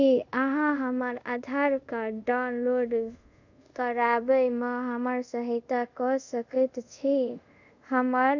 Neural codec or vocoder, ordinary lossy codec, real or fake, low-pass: codec, 24 kHz, 0.5 kbps, DualCodec; none; fake; 7.2 kHz